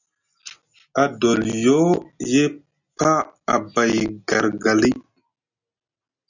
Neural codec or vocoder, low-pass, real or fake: none; 7.2 kHz; real